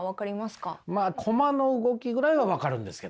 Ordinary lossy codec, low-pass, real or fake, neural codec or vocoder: none; none; real; none